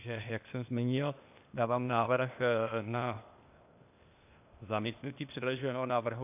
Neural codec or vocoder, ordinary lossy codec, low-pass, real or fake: codec, 16 kHz, 0.8 kbps, ZipCodec; AAC, 32 kbps; 3.6 kHz; fake